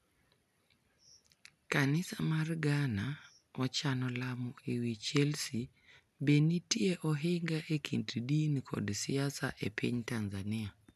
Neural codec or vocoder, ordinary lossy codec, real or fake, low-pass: none; none; real; 14.4 kHz